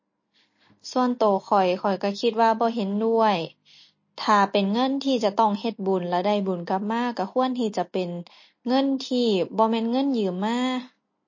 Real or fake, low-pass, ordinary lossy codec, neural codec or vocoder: real; 7.2 kHz; MP3, 32 kbps; none